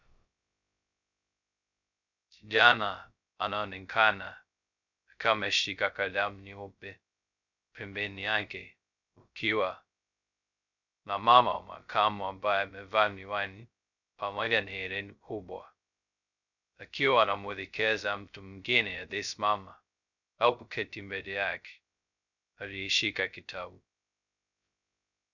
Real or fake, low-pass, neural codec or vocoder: fake; 7.2 kHz; codec, 16 kHz, 0.2 kbps, FocalCodec